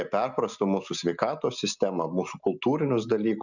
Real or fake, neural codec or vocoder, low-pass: real; none; 7.2 kHz